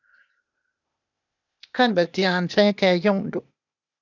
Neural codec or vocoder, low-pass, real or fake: codec, 16 kHz, 0.8 kbps, ZipCodec; 7.2 kHz; fake